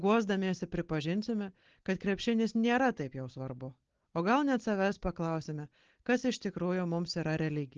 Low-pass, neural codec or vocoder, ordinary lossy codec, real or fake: 7.2 kHz; none; Opus, 16 kbps; real